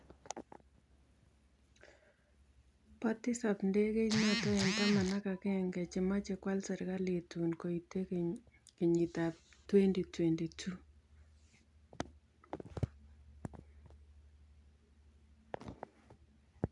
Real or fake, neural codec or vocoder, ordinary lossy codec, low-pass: real; none; none; 10.8 kHz